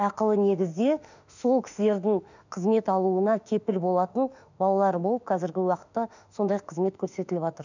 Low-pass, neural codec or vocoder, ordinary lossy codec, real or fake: 7.2 kHz; autoencoder, 48 kHz, 32 numbers a frame, DAC-VAE, trained on Japanese speech; none; fake